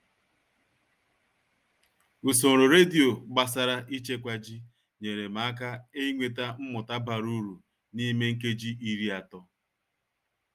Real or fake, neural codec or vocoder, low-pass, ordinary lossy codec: real; none; 14.4 kHz; Opus, 32 kbps